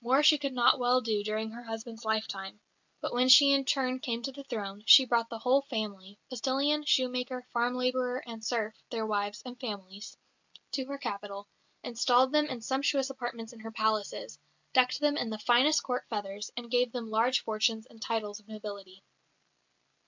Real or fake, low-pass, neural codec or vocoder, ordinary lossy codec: real; 7.2 kHz; none; MP3, 64 kbps